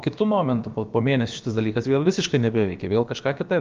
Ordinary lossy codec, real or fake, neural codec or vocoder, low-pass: Opus, 24 kbps; fake; codec, 16 kHz, about 1 kbps, DyCAST, with the encoder's durations; 7.2 kHz